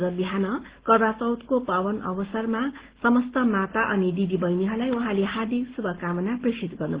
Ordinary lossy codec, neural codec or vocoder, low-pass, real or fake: Opus, 16 kbps; none; 3.6 kHz; real